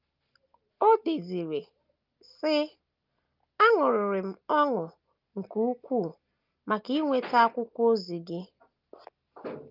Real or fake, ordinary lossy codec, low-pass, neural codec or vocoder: real; Opus, 24 kbps; 5.4 kHz; none